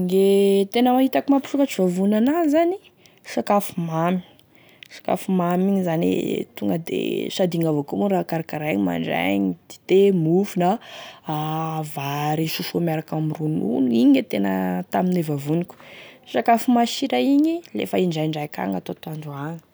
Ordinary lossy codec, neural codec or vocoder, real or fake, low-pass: none; none; real; none